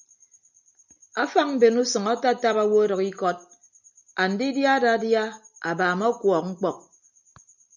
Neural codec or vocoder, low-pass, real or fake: none; 7.2 kHz; real